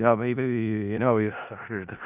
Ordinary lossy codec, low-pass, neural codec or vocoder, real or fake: none; 3.6 kHz; codec, 16 kHz in and 24 kHz out, 0.4 kbps, LongCat-Audio-Codec, four codebook decoder; fake